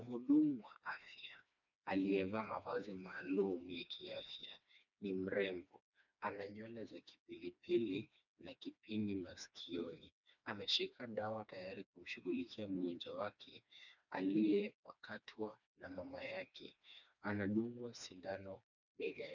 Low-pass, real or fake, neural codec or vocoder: 7.2 kHz; fake; codec, 16 kHz, 2 kbps, FreqCodec, smaller model